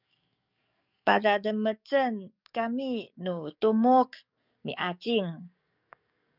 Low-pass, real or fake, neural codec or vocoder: 5.4 kHz; fake; codec, 44.1 kHz, 7.8 kbps, DAC